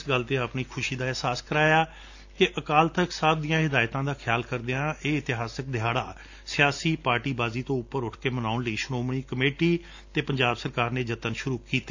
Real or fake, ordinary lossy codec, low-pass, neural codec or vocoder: real; AAC, 48 kbps; 7.2 kHz; none